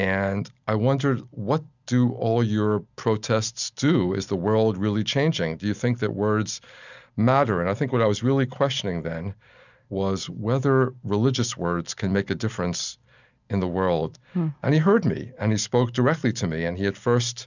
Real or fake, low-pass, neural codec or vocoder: real; 7.2 kHz; none